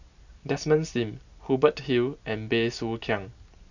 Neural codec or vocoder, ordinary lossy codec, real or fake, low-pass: none; none; real; 7.2 kHz